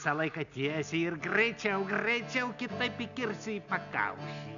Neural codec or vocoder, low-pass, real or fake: none; 7.2 kHz; real